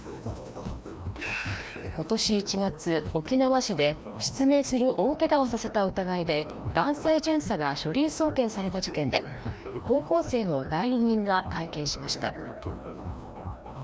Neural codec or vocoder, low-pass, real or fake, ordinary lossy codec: codec, 16 kHz, 1 kbps, FreqCodec, larger model; none; fake; none